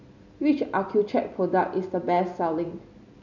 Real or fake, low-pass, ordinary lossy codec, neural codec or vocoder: real; 7.2 kHz; none; none